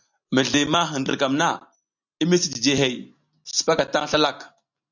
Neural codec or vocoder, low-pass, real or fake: none; 7.2 kHz; real